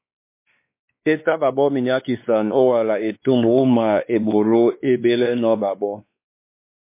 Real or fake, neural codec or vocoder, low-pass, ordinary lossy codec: fake; codec, 16 kHz, 2 kbps, X-Codec, WavLM features, trained on Multilingual LibriSpeech; 3.6 kHz; MP3, 24 kbps